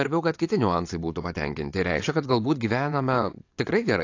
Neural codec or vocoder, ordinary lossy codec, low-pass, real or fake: vocoder, 22.05 kHz, 80 mel bands, WaveNeXt; AAC, 48 kbps; 7.2 kHz; fake